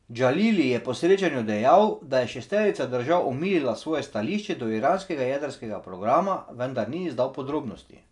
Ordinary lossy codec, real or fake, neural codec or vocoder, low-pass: none; real; none; 10.8 kHz